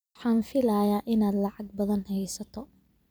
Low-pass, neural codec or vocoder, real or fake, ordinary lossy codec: none; vocoder, 44.1 kHz, 128 mel bands every 256 samples, BigVGAN v2; fake; none